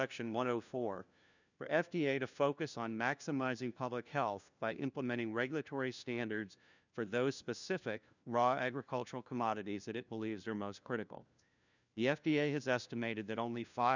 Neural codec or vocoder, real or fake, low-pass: codec, 16 kHz, 1 kbps, FunCodec, trained on LibriTTS, 50 frames a second; fake; 7.2 kHz